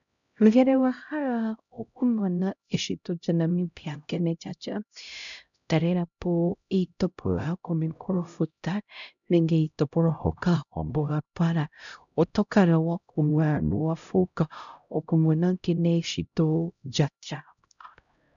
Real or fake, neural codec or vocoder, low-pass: fake; codec, 16 kHz, 0.5 kbps, X-Codec, HuBERT features, trained on LibriSpeech; 7.2 kHz